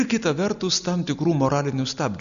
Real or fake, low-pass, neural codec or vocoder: real; 7.2 kHz; none